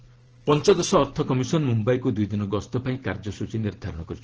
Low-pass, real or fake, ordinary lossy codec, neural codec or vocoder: 7.2 kHz; fake; Opus, 16 kbps; vocoder, 44.1 kHz, 128 mel bands every 512 samples, BigVGAN v2